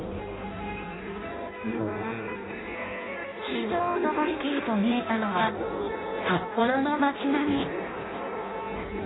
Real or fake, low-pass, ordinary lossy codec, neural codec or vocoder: fake; 7.2 kHz; AAC, 16 kbps; codec, 16 kHz in and 24 kHz out, 0.6 kbps, FireRedTTS-2 codec